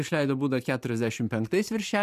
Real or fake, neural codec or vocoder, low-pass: fake; vocoder, 44.1 kHz, 128 mel bands every 512 samples, BigVGAN v2; 14.4 kHz